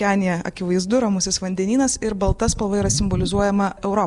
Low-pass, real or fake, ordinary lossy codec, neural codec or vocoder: 10.8 kHz; real; Opus, 64 kbps; none